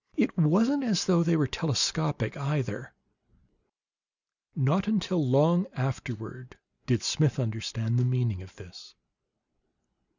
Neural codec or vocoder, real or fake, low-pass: none; real; 7.2 kHz